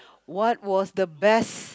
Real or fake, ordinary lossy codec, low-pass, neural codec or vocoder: real; none; none; none